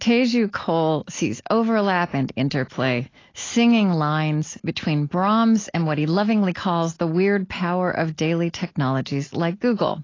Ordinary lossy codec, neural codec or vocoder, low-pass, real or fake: AAC, 32 kbps; none; 7.2 kHz; real